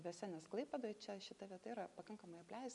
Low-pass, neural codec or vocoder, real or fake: 10.8 kHz; none; real